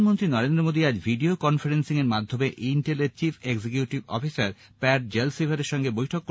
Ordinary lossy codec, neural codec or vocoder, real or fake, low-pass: none; none; real; none